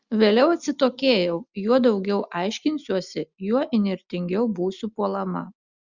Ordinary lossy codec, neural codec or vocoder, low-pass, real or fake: Opus, 64 kbps; none; 7.2 kHz; real